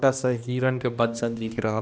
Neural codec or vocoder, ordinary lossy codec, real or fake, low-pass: codec, 16 kHz, 1 kbps, X-Codec, HuBERT features, trained on balanced general audio; none; fake; none